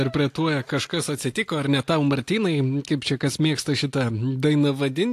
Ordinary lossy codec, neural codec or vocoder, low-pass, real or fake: AAC, 64 kbps; none; 14.4 kHz; real